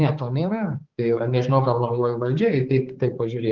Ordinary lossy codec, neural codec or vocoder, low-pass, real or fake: Opus, 16 kbps; codec, 16 kHz, 4 kbps, X-Codec, HuBERT features, trained on balanced general audio; 7.2 kHz; fake